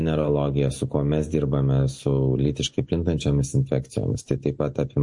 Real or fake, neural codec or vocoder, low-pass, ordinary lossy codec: real; none; 10.8 kHz; MP3, 48 kbps